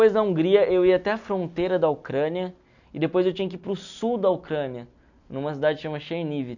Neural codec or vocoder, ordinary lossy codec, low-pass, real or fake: none; none; 7.2 kHz; real